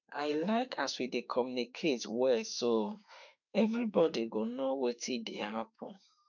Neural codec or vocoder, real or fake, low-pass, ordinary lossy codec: autoencoder, 48 kHz, 32 numbers a frame, DAC-VAE, trained on Japanese speech; fake; 7.2 kHz; none